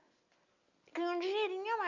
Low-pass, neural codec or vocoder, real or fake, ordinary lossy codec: 7.2 kHz; none; real; none